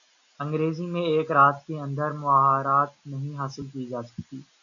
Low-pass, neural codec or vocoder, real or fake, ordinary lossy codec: 7.2 kHz; none; real; MP3, 96 kbps